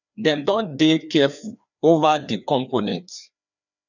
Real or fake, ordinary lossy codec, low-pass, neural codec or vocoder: fake; none; 7.2 kHz; codec, 16 kHz, 2 kbps, FreqCodec, larger model